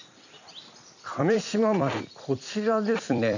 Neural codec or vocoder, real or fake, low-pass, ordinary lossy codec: vocoder, 44.1 kHz, 128 mel bands every 256 samples, BigVGAN v2; fake; 7.2 kHz; none